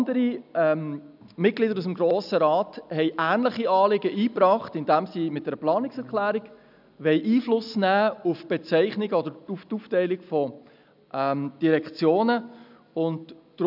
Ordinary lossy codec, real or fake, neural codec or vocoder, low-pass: none; real; none; 5.4 kHz